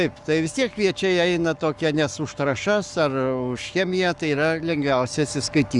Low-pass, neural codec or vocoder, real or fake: 10.8 kHz; codec, 44.1 kHz, 7.8 kbps, Pupu-Codec; fake